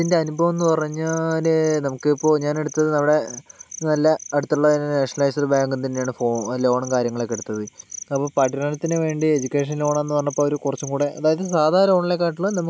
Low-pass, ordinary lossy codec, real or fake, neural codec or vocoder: none; none; real; none